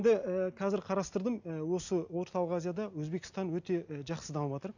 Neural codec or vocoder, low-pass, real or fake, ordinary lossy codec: none; 7.2 kHz; real; none